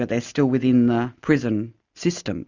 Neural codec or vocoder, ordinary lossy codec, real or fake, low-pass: none; Opus, 64 kbps; real; 7.2 kHz